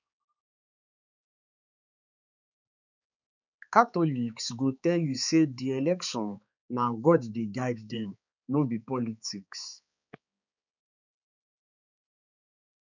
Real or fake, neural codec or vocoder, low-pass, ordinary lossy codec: fake; codec, 16 kHz, 4 kbps, X-Codec, HuBERT features, trained on balanced general audio; 7.2 kHz; none